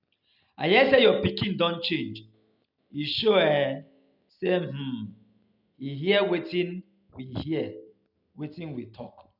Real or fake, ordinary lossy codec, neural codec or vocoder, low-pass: real; none; none; 5.4 kHz